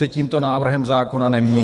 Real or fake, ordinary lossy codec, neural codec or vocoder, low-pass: fake; MP3, 96 kbps; codec, 24 kHz, 3 kbps, HILCodec; 10.8 kHz